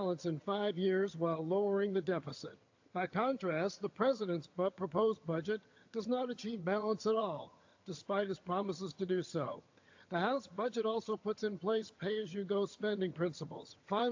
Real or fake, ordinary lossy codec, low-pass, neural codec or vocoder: fake; AAC, 48 kbps; 7.2 kHz; vocoder, 22.05 kHz, 80 mel bands, HiFi-GAN